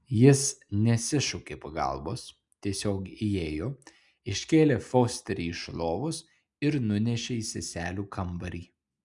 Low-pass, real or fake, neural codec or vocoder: 10.8 kHz; real; none